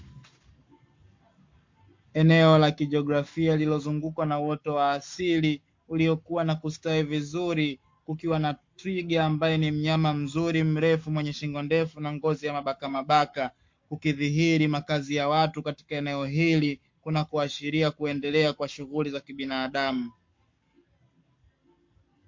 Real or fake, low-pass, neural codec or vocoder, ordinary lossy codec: fake; 7.2 kHz; codec, 44.1 kHz, 7.8 kbps, Pupu-Codec; MP3, 48 kbps